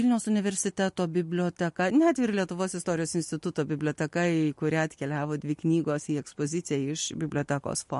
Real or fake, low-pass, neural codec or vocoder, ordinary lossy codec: real; 14.4 kHz; none; MP3, 48 kbps